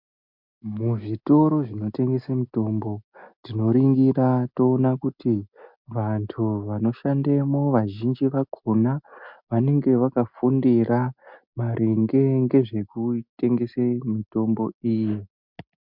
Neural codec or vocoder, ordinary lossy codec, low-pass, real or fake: none; MP3, 48 kbps; 5.4 kHz; real